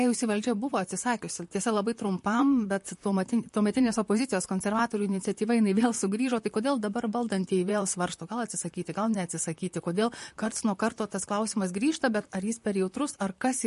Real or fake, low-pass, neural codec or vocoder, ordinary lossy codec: fake; 14.4 kHz; vocoder, 44.1 kHz, 128 mel bands, Pupu-Vocoder; MP3, 48 kbps